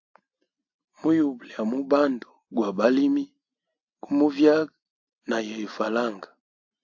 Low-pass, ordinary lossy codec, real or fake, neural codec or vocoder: 7.2 kHz; AAC, 48 kbps; real; none